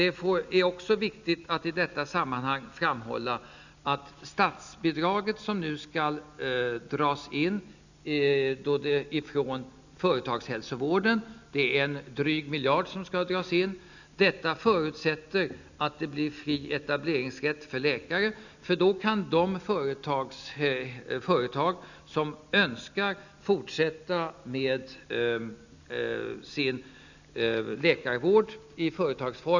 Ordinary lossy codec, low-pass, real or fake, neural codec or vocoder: none; 7.2 kHz; real; none